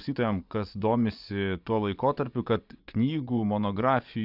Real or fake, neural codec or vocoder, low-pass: fake; vocoder, 24 kHz, 100 mel bands, Vocos; 5.4 kHz